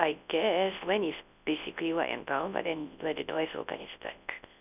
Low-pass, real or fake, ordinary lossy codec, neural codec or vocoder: 3.6 kHz; fake; none; codec, 24 kHz, 0.9 kbps, WavTokenizer, large speech release